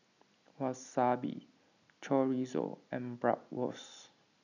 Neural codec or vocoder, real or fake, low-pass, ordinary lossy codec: none; real; 7.2 kHz; none